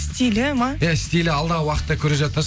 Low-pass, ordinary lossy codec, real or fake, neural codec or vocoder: none; none; real; none